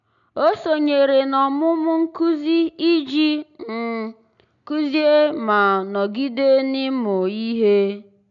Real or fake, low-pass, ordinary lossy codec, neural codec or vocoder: real; 7.2 kHz; none; none